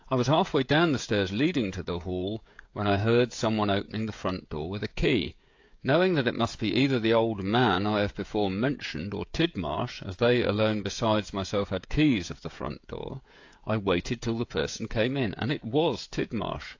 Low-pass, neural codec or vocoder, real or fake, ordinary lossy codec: 7.2 kHz; codec, 16 kHz, 16 kbps, FreqCodec, smaller model; fake; AAC, 48 kbps